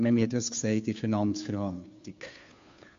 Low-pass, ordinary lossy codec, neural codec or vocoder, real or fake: 7.2 kHz; MP3, 48 kbps; codec, 16 kHz, 2 kbps, FunCodec, trained on Chinese and English, 25 frames a second; fake